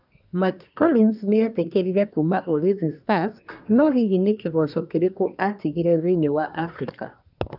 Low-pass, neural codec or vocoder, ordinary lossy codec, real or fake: 5.4 kHz; codec, 24 kHz, 1 kbps, SNAC; none; fake